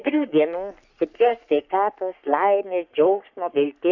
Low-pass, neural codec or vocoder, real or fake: 7.2 kHz; codec, 44.1 kHz, 3.4 kbps, Pupu-Codec; fake